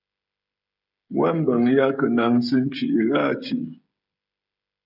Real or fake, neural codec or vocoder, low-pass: fake; codec, 16 kHz, 16 kbps, FreqCodec, smaller model; 5.4 kHz